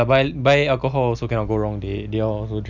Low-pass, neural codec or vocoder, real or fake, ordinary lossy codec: 7.2 kHz; none; real; none